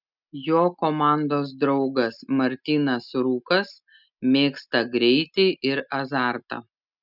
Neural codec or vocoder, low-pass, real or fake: none; 5.4 kHz; real